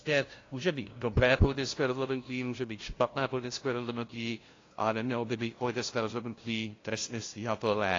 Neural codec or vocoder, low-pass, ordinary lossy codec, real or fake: codec, 16 kHz, 0.5 kbps, FunCodec, trained on LibriTTS, 25 frames a second; 7.2 kHz; AAC, 32 kbps; fake